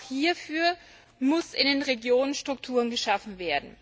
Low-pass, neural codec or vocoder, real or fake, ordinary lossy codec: none; none; real; none